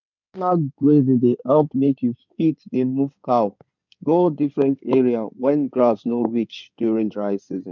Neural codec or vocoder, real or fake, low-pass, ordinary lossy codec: codec, 16 kHz in and 24 kHz out, 2.2 kbps, FireRedTTS-2 codec; fake; 7.2 kHz; none